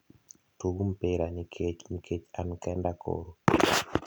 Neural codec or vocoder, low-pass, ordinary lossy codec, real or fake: none; none; none; real